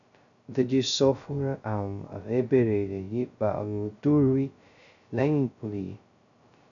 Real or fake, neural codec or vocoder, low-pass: fake; codec, 16 kHz, 0.2 kbps, FocalCodec; 7.2 kHz